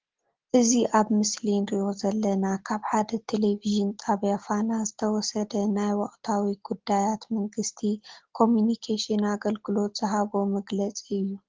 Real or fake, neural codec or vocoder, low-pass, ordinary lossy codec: real; none; 7.2 kHz; Opus, 16 kbps